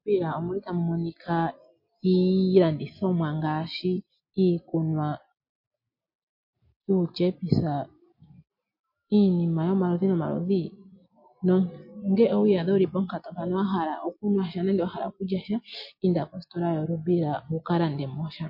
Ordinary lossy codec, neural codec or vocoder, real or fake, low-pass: MP3, 32 kbps; none; real; 5.4 kHz